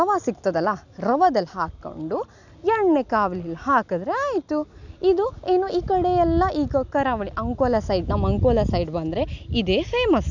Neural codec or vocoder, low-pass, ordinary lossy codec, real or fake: none; 7.2 kHz; none; real